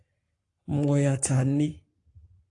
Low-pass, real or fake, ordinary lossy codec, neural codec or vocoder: 10.8 kHz; fake; AAC, 48 kbps; codec, 44.1 kHz, 7.8 kbps, Pupu-Codec